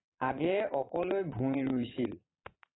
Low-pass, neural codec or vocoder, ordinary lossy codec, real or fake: 7.2 kHz; vocoder, 22.05 kHz, 80 mel bands, Vocos; AAC, 16 kbps; fake